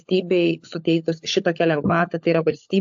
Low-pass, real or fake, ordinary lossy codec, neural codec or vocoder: 7.2 kHz; fake; AAC, 64 kbps; codec, 16 kHz, 16 kbps, FunCodec, trained on LibriTTS, 50 frames a second